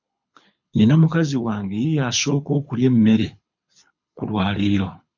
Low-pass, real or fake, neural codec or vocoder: 7.2 kHz; fake; codec, 24 kHz, 6 kbps, HILCodec